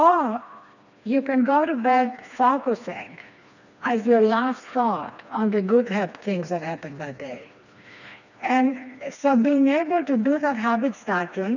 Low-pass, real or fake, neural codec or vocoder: 7.2 kHz; fake; codec, 16 kHz, 2 kbps, FreqCodec, smaller model